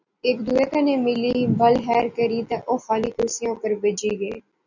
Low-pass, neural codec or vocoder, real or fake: 7.2 kHz; none; real